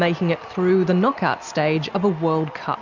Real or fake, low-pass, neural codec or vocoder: real; 7.2 kHz; none